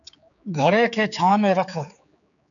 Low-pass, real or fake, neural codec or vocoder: 7.2 kHz; fake; codec, 16 kHz, 4 kbps, X-Codec, HuBERT features, trained on general audio